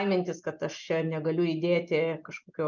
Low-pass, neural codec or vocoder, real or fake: 7.2 kHz; none; real